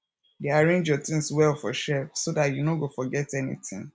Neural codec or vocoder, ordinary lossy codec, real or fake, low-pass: none; none; real; none